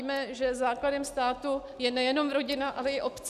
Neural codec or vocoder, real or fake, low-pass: none; real; 14.4 kHz